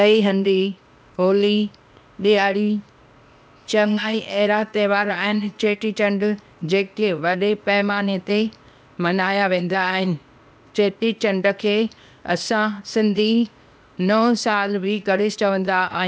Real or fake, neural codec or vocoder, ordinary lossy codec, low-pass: fake; codec, 16 kHz, 0.8 kbps, ZipCodec; none; none